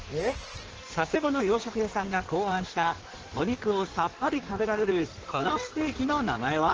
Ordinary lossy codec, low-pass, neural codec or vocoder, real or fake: Opus, 16 kbps; 7.2 kHz; codec, 16 kHz in and 24 kHz out, 1.1 kbps, FireRedTTS-2 codec; fake